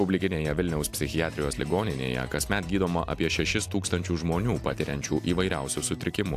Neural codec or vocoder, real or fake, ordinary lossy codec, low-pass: none; real; AAC, 64 kbps; 14.4 kHz